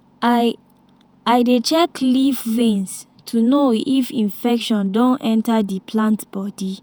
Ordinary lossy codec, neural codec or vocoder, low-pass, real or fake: none; vocoder, 48 kHz, 128 mel bands, Vocos; none; fake